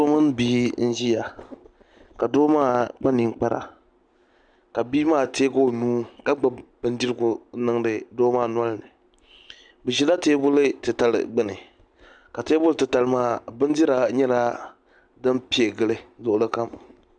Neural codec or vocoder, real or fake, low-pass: none; real; 9.9 kHz